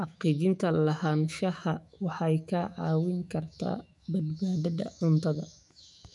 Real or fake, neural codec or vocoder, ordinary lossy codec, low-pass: fake; autoencoder, 48 kHz, 128 numbers a frame, DAC-VAE, trained on Japanese speech; none; 10.8 kHz